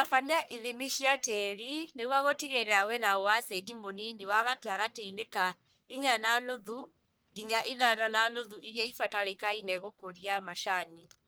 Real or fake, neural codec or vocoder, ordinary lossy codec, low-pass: fake; codec, 44.1 kHz, 1.7 kbps, Pupu-Codec; none; none